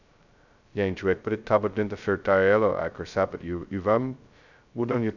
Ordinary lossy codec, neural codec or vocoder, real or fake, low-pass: none; codec, 16 kHz, 0.2 kbps, FocalCodec; fake; 7.2 kHz